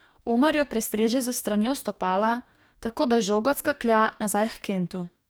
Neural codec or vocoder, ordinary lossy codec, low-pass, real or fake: codec, 44.1 kHz, 2.6 kbps, DAC; none; none; fake